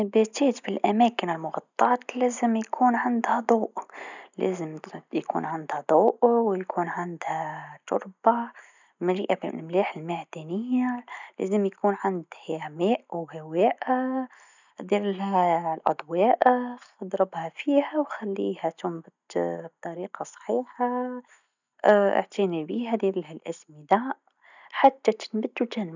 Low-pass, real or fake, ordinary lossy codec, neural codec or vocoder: 7.2 kHz; real; none; none